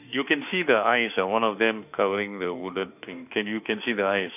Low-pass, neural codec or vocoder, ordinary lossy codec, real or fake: 3.6 kHz; autoencoder, 48 kHz, 32 numbers a frame, DAC-VAE, trained on Japanese speech; none; fake